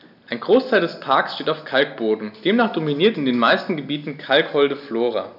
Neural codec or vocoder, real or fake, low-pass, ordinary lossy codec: none; real; 5.4 kHz; none